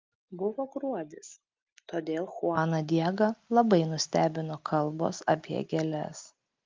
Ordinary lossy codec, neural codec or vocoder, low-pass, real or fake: Opus, 24 kbps; none; 7.2 kHz; real